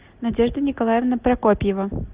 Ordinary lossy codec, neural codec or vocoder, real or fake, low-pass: Opus, 24 kbps; none; real; 3.6 kHz